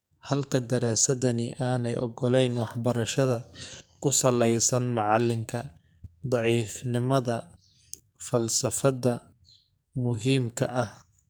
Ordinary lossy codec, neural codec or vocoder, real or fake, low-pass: none; codec, 44.1 kHz, 2.6 kbps, SNAC; fake; none